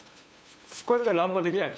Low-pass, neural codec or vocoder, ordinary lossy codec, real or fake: none; codec, 16 kHz, 2 kbps, FunCodec, trained on LibriTTS, 25 frames a second; none; fake